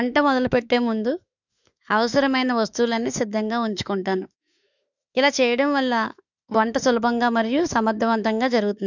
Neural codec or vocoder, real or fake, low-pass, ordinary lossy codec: autoencoder, 48 kHz, 32 numbers a frame, DAC-VAE, trained on Japanese speech; fake; 7.2 kHz; none